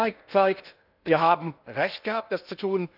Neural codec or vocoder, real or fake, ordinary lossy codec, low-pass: codec, 16 kHz in and 24 kHz out, 0.6 kbps, FocalCodec, streaming, 2048 codes; fake; none; 5.4 kHz